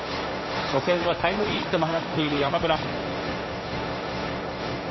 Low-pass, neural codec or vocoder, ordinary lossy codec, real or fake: 7.2 kHz; codec, 16 kHz, 1.1 kbps, Voila-Tokenizer; MP3, 24 kbps; fake